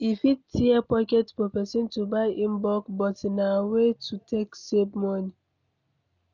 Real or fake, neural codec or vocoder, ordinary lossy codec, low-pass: real; none; none; 7.2 kHz